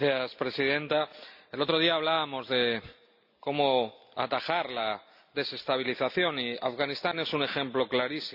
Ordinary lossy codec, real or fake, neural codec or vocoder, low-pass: none; real; none; 5.4 kHz